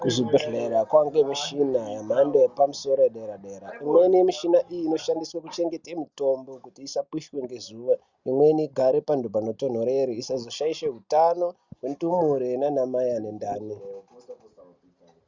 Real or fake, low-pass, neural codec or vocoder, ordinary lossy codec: real; 7.2 kHz; none; Opus, 64 kbps